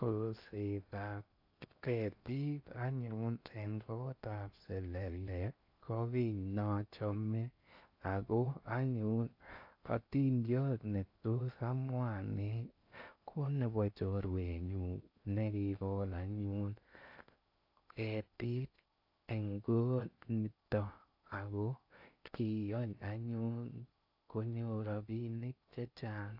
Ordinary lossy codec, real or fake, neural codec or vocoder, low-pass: AAC, 48 kbps; fake; codec, 16 kHz in and 24 kHz out, 0.6 kbps, FocalCodec, streaming, 2048 codes; 5.4 kHz